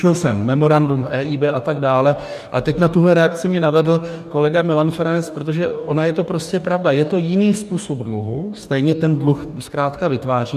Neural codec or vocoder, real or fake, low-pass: codec, 44.1 kHz, 2.6 kbps, DAC; fake; 14.4 kHz